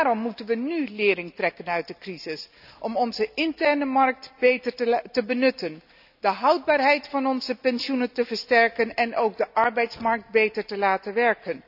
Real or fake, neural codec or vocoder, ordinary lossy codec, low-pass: real; none; none; 5.4 kHz